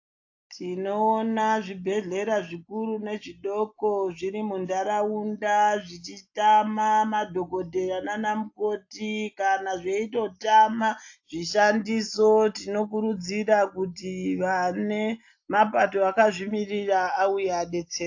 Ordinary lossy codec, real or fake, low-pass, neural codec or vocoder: AAC, 48 kbps; real; 7.2 kHz; none